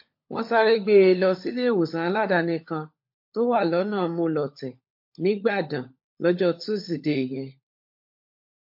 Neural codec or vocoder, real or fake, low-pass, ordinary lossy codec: codec, 16 kHz, 16 kbps, FunCodec, trained on LibriTTS, 50 frames a second; fake; 5.4 kHz; MP3, 32 kbps